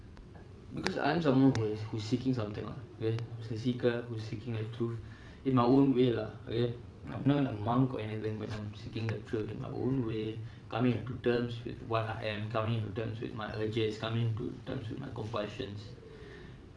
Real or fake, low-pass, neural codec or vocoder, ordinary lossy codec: fake; none; vocoder, 22.05 kHz, 80 mel bands, WaveNeXt; none